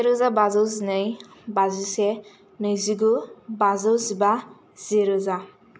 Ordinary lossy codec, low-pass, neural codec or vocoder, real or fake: none; none; none; real